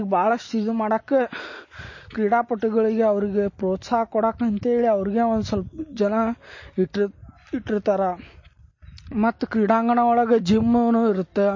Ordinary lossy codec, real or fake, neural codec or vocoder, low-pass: MP3, 32 kbps; real; none; 7.2 kHz